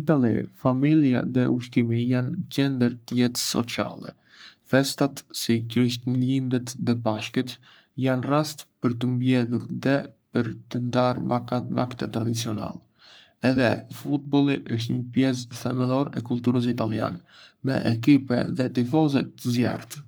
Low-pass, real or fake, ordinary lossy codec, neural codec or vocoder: none; fake; none; codec, 44.1 kHz, 3.4 kbps, Pupu-Codec